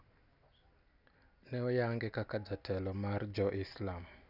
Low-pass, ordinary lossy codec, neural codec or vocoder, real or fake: 5.4 kHz; none; none; real